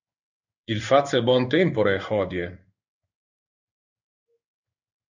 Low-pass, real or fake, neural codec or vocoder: 7.2 kHz; fake; codec, 16 kHz in and 24 kHz out, 1 kbps, XY-Tokenizer